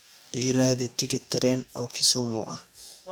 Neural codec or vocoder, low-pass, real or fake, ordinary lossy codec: codec, 44.1 kHz, 2.6 kbps, DAC; none; fake; none